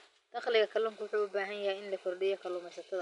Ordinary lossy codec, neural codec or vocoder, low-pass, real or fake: MP3, 64 kbps; none; 10.8 kHz; real